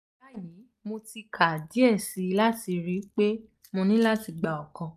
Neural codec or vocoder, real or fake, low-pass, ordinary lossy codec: none; real; 14.4 kHz; none